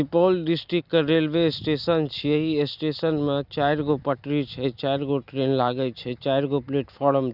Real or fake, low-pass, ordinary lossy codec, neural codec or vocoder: real; 5.4 kHz; Opus, 64 kbps; none